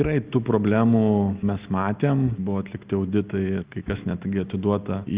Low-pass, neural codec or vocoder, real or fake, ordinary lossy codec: 3.6 kHz; none; real; Opus, 32 kbps